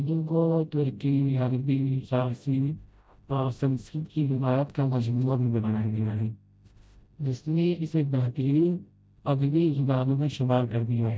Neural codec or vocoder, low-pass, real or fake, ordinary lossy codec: codec, 16 kHz, 0.5 kbps, FreqCodec, smaller model; none; fake; none